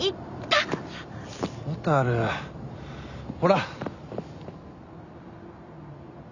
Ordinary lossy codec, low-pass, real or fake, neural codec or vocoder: none; 7.2 kHz; real; none